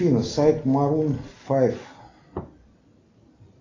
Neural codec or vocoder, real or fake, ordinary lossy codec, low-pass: none; real; AAC, 32 kbps; 7.2 kHz